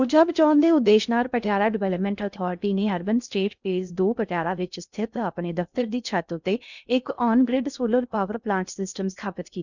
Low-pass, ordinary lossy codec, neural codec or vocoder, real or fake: 7.2 kHz; none; codec, 16 kHz in and 24 kHz out, 0.6 kbps, FocalCodec, streaming, 2048 codes; fake